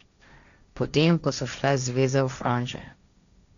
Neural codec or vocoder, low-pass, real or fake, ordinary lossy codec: codec, 16 kHz, 1.1 kbps, Voila-Tokenizer; 7.2 kHz; fake; none